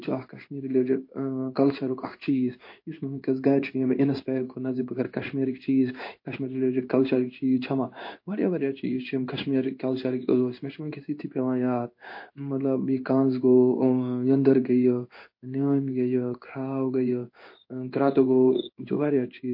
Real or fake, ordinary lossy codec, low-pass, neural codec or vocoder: fake; MP3, 32 kbps; 5.4 kHz; codec, 16 kHz in and 24 kHz out, 1 kbps, XY-Tokenizer